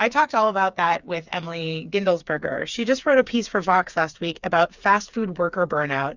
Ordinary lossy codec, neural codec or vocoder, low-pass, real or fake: Opus, 64 kbps; codec, 16 kHz, 4 kbps, FreqCodec, smaller model; 7.2 kHz; fake